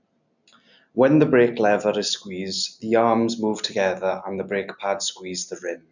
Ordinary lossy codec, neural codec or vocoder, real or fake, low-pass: none; none; real; 7.2 kHz